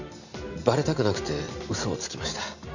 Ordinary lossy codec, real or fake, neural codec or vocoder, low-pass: none; real; none; 7.2 kHz